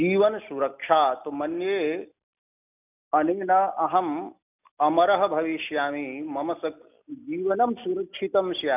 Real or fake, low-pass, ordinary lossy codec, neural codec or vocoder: real; 3.6 kHz; none; none